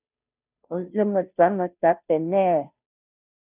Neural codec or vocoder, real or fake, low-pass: codec, 16 kHz, 0.5 kbps, FunCodec, trained on Chinese and English, 25 frames a second; fake; 3.6 kHz